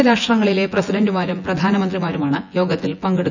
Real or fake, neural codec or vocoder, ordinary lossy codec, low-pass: fake; vocoder, 24 kHz, 100 mel bands, Vocos; none; 7.2 kHz